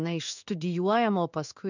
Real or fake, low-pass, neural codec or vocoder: fake; 7.2 kHz; codec, 16 kHz in and 24 kHz out, 1 kbps, XY-Tokenizer